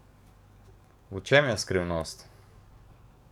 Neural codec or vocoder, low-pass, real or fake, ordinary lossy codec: codec, 44.1 kHz, 7.8 kbps, DAC; 19.8 kHz; fake; none